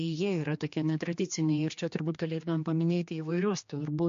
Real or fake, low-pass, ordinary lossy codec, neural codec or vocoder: fake; 7.2 kHz; MP3, 48 kbps; codec, 16 kHz, 2 kbps, X-Codec, HuBERT features, trained on general audio